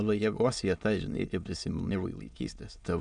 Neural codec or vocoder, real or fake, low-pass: autoencoder, 22.05 kHz, a latent of 192 numbers a frame, VITS, trained on many speakers; fake; 9.9 kHz